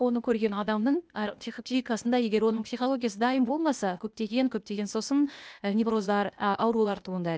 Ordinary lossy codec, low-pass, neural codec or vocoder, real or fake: none; none; codec, 16 kHz, 0.8 kbps, ZipCodec; fake